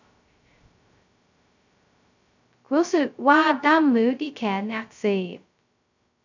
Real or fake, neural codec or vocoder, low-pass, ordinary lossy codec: fake; codec, 16 kHz, 0.2 kbps, FocalCodec; 7.2 kHz; none